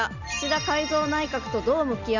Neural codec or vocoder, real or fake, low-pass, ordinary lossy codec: none; real; 7.2 kHz; none